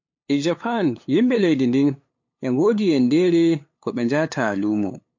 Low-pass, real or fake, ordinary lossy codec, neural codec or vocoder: 7.2 kHz; fake; MP3, 48 kbps; codec, 16 kHz, 8 kbps, FunCodec, trained on LibriTTS, 25 frames a second